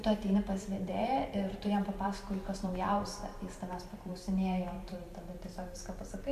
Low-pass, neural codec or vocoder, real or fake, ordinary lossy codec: 14.4 kHz; vocoder, 44.1 kHz, 128 mel bands every 512 samples, BigVGAN v2; fake; MP3, 96 kbps